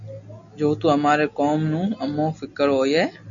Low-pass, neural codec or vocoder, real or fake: 7.2 kHz; none; real